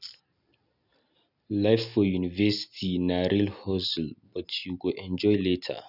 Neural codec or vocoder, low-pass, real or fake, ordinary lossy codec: none; 5.4 kHz; real; none